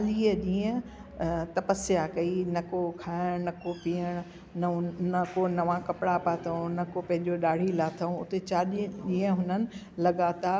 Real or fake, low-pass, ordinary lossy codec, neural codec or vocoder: real; none; none; none